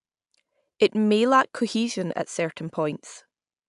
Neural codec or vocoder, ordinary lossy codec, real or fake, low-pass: none; AAC, 96 kbps; real; 10.8 kHz